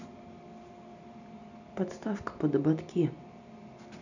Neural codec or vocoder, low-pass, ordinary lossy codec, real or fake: none; 7.2 kHz; none; real